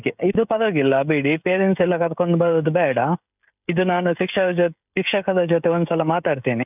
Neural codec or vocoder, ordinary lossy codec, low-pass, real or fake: codec, 16 kHz, 16 kbps, FreqCodec, smaller model; none; 3.6 kHz; fake